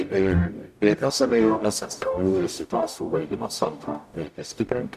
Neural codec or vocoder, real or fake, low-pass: codec, 44.1 kHz, 0.9 kbps, DAC; fake; 14.4 kHz